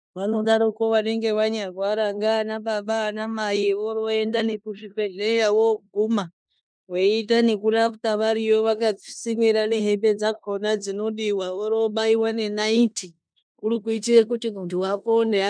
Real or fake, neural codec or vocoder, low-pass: fake; codec, 16 kHz in and 24 kHz out, 0.9 kbps, LongCat-Audio-Codec, four codebook decoder; 9.9 kHz